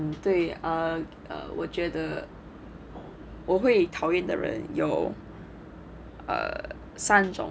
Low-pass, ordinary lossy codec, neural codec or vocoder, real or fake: none; none; none; real